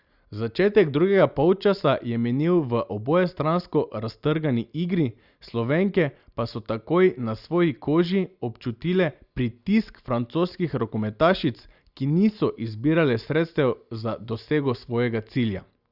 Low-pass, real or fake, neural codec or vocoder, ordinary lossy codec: 5.4 kHz; real; none; Opus, 64 kbps